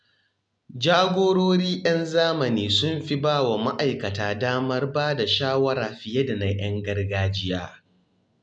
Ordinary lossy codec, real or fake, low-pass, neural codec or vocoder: MP3, 96 kbps; real; 9.9 kHz; none